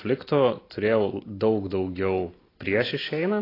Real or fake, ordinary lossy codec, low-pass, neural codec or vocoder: real; AAC, 24 kbps; 5.4 kHz; none